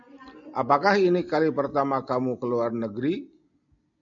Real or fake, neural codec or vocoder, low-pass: real; none; 7.2 kHz